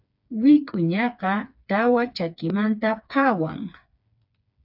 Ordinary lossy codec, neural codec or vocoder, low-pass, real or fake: AAC, 48 kbps; codec, 16 kHz, 4 kbps, FreqCodec, smaller model; 5.4 kHz; fake